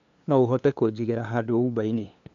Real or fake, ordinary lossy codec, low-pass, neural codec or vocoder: fake; AAC, 64 kbps; 7.2 kHz; codec, 16 kHz, 2 kbps, FunCodec, trained on LibriTTS, 25 frames a second